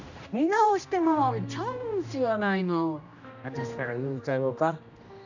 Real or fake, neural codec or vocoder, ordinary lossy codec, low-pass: fake; codec, 16 kHz, 1 kbps, X-Codec, HuBERT features, trained on general audio; none; 7.2 kHz